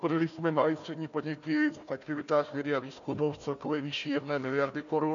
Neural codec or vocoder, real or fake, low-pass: codec, 16 kHz, 1 kbps, FunCodec, trained on Chinese and English, 50 frames a second; fake; 7.2 kHz